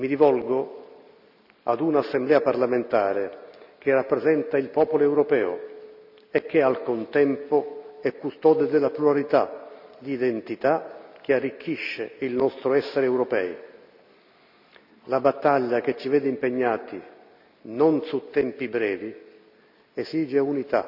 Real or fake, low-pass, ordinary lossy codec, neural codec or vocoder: real; 5.4 kHz; none; none